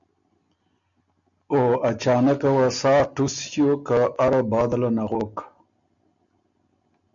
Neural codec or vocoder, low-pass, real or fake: none; 7.2 kHz; real